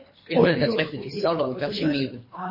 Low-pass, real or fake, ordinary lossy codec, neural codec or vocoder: 5.4 kHz; fake; MP3, 24 kbps; codec, 24 kHz, 3 kbps, HILCodec